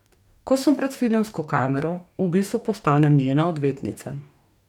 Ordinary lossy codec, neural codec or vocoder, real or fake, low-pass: none; codec, 44.1 kHz, 2.6 kbps, DAC; fake; 19.8 kHz